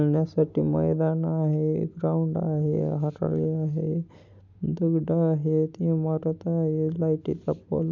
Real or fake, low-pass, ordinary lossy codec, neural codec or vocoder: real; 7.2 kHz; none; none